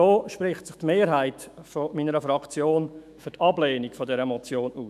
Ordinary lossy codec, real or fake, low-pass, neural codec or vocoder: none; fake; 14.4 kHz; autoencoder, 48 kHz, 128 numbers a frame, DAC-VAE, trained on Japanese speech